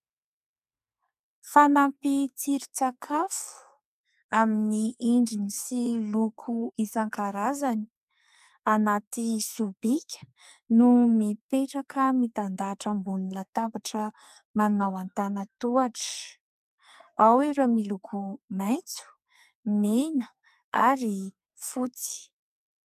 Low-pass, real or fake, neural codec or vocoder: 14.4 kHz; fake; codec, 44.1 kHz, 2.6 kbps, SNAC